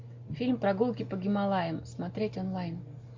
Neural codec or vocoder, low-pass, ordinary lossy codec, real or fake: none; 7.2 kHz; MP3, 64 kbps; real